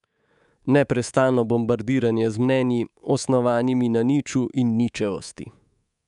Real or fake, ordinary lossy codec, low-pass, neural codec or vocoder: fake; none; 10.8 kHz; codec, 24 kHz, 3.1 kbps, DualCodec